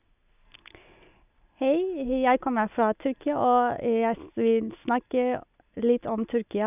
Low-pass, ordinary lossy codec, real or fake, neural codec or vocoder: 3.6 kHz; none; real; none